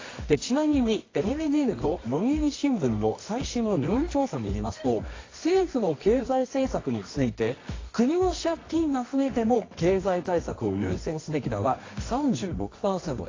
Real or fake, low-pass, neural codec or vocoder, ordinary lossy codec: fake; 7.2 kHz; codec, 24 kHz, 0.9 kbps, WavTokenizer, medium music audio release; AAC, 32 kbps